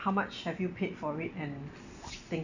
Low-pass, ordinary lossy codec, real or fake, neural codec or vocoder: 7.2 kHz; none; real; none